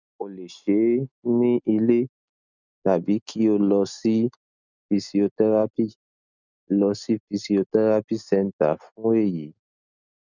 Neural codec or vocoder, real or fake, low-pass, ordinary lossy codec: none; real; 7.2 kHz; none